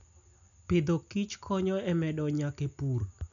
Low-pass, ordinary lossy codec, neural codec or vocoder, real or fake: 7.2 kHz; none; none; real